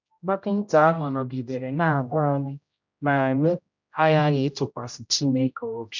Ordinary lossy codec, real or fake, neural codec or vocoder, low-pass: none; fake; codec, 16 kHz, 0.5 kbps, X-Codec, HuBERT features, trained on general audio; 7.2 kHz